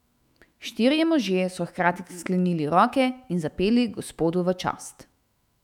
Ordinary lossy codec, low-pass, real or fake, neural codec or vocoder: none; 19.8 kHz; fake; autoencoder, 48 kHz, 128 numbers a frame, DAC-VAE, trained on Japanese speech